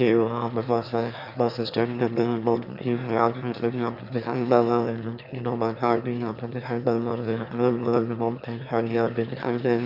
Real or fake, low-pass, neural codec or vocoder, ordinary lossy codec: fake; 5.4 kHz; autoencoder, 22.05 kHz, a latent of 192 numbers a frame, VITS, trained on one speaker; none